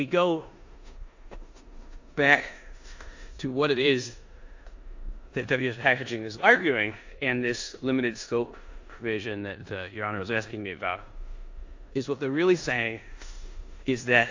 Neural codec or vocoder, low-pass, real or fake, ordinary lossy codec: codec, 16 kHz in and 24 kHz out, 0.9 kbps, LongCat-Audio-Codec, four codebook decoder; 7.2 kHz; fake; AAC, 48 kbps